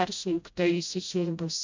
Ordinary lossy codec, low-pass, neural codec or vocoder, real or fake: MP3, 64 kbps; 7.2 kHz; codec, 16 kHz, 0.5 kbps, FreqCodec, smaller model; fake